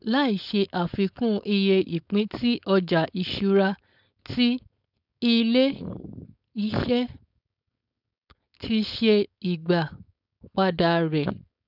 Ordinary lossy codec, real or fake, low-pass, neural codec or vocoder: none; fake; 5.4 kHz; codec, 16 kHz, 4.8 kbps, FACodec